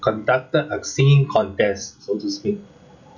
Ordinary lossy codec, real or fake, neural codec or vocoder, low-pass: none; real; none; 7.2 kHz